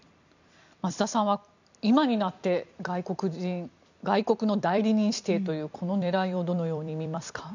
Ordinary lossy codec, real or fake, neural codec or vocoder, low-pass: none; fake; vocoder, 44.1 kHz, 128 mel bands every 256 samples, BigVGAN v2; 7.2 kHz